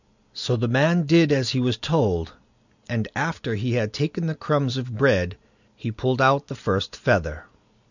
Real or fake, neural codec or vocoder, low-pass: real; none; 7.2 kHz